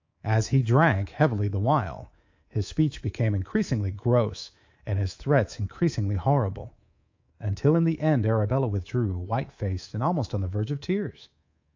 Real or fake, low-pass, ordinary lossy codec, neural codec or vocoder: fake; 7.2 kHz; AAC, 48 kbps; codec, 24 kHz, 3.1 kbps, DualCodec